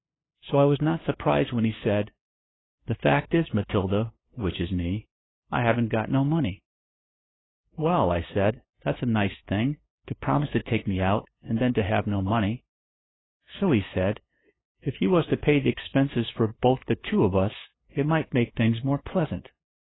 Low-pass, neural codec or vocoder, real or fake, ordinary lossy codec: 7.2 kHz; codec, 16 kHz, 2 kbps, FunCodec, trained on LibriTTS, 25 frames a second; fake; AAC, 16 kbps